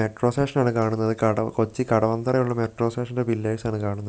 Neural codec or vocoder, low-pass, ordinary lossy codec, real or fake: none; none; none; real